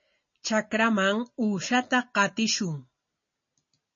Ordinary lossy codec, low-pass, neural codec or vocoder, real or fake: MP3, 32 kbps; 7.2 kHz; none; real